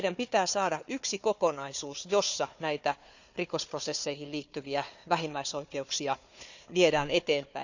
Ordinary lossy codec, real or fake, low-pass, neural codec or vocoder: none; fake; 7.2 kHz; codec, 16 kHz, 4 kbps, FunCodec, trained on Chinese and English, 50 frames a second